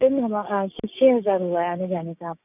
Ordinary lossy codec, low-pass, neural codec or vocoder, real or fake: none; 3.6 kHz; codec, 16 kHz, 6 kbps, DAC; fake